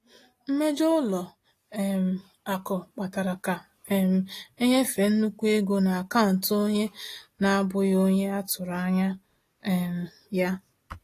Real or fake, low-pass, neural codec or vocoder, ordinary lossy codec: real; 14.4 kHz; none; AAC, 48 kbps